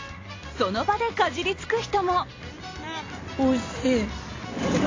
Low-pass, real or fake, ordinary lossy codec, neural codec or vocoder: 7.2 kHz; real; AAC, 32 kbps; none